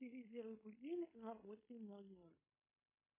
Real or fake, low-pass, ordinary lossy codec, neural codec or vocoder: fake; 3.6 kHz; MP3, 16 kbps; codec, 16 kHz in and 24 kHz out, 0.9 kbps, LongCat-Audio-Codec, fine tuned four codebook decoder